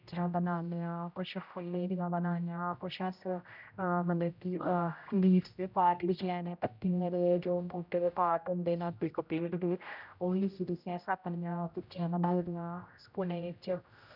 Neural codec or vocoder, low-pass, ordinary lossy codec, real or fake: codec, 16 kHz, 0.5 kbps, X-Codec, HuBERT features, trained on general audio; 5.4 kHz; none; fake